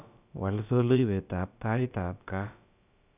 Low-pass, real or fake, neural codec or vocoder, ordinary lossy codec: 3.6 kHz; fake; codec, 16 kHz, about 1 kbps, DyCAST, with the encoder's durations; none